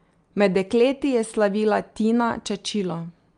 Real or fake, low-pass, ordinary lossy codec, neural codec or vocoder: real; 9.9 kHz; Opus, 32 kbps; none